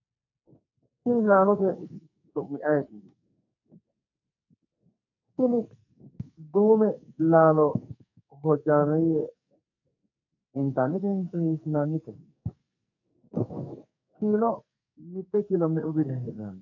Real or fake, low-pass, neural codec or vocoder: fake; 7.2 kHz; codec, 44.1 kHz, 2.6 kbps, SNAC